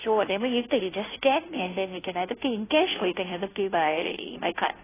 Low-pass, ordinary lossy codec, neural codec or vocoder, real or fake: 3.6 kHz; AAC, 16 kbps; codec, 16 kHz, 0.5 kbps, FunCodec, trained on Chinese and English, 25 frames a second; fake